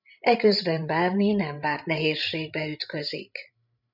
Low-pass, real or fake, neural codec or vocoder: 5.4 kHz; fake; codec, 16 kHz, 16 kbps, FreqCodec, larger model